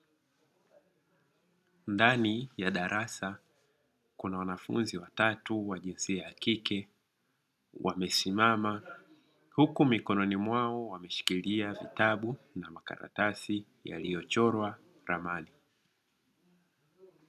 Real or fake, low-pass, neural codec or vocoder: real; 14.4 kHz; none